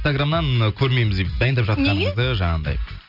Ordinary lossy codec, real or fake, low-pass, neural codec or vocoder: none; real; 5.4 kHz; none